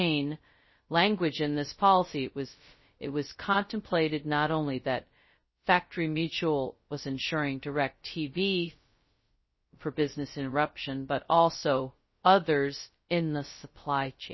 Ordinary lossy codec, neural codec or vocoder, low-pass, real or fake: MP3, 24 kbps; codec, 16 kHz, 0.2 kbps, FocalCodec; 7.2 kHz; fake